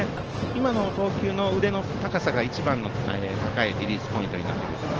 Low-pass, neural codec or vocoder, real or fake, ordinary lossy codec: 7.2 kHz; none; real; Opus, 16 kbps